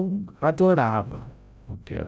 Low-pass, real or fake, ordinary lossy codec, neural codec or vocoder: none; fake; none; codec, 16 kHz, 0.5 kbps, FreqCodec, larger model